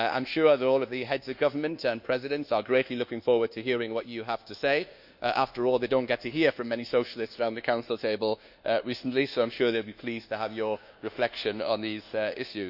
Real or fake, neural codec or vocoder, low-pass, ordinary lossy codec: fake; codec, 24 kHz, 1.2 kbps, DualCodec; 5.4 kHz; none